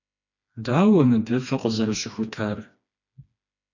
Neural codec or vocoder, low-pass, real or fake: codec, 16 kHz, 2 kbps, FreqCodec, smaller model; 7.2 kHz; fake